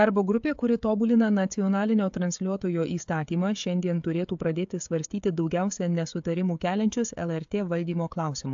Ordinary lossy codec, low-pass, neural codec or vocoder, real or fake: AAC, 64 kbps; 7.2 kHz; codec, 16 kHz, 16 kbps, FreqCodec, smaller model; fake